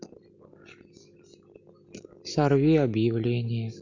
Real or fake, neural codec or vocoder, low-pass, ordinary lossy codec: real; none; 7.2 kHz; none